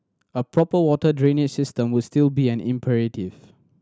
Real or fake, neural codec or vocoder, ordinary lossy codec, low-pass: real; none; none; none